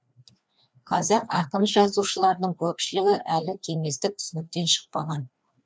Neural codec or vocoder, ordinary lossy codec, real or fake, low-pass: codec, 16 kHz, 2 kbps, FreqCodec, larger model; none; fake; none